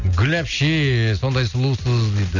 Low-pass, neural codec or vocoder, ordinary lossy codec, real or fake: 7.2 kHz; none; none; real